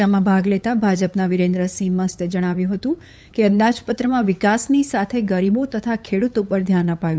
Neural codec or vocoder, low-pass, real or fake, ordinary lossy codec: codec, 16 kHz, 8 kbps, FunCodec, trained on LibriTTS, 25 frames a second; none; fake; none